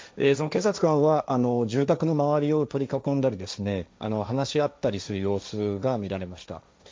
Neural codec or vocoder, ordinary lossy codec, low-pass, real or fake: codec, 16 kHz, 1.1 kbps, Voila-Tokenizer; none; none; fake